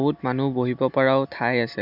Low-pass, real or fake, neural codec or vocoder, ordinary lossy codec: 5.4 kHz; real; none; none